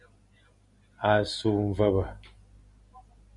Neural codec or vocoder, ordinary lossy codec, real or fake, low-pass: none; MP3, 96 kbps; real; 10.8 kHz